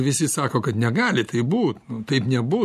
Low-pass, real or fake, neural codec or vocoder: 14.4 kHz; real; none